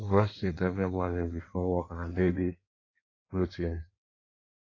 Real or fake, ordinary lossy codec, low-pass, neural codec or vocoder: fake; AAC, 32 kbps; 7.2 kHz; codec, 16 kHz in and 24 kHz out, 1.1 kbps, FireRedTTS-2 codec